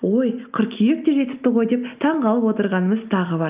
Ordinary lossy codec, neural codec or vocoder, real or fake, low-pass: Opus, 24 kbps; none; real; 3.6 kHz